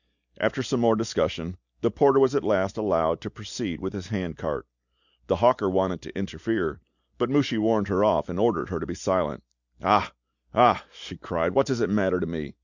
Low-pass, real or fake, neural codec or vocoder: 7.2 kHz; real; none